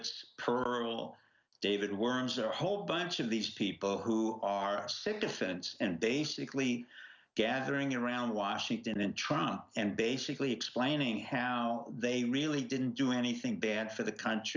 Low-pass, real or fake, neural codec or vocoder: 7.2 kHz; real; none